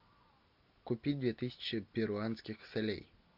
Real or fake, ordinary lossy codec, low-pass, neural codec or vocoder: real; MP3, 48 kbps; 5.4 kHz; none